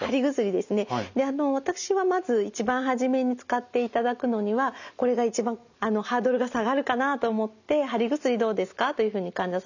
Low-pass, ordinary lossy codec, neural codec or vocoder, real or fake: 7.2 kHz; none; none; real